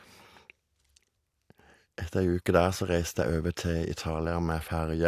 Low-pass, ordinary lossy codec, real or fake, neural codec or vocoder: 14.4 kHz; AAC, 64 kbps; real; none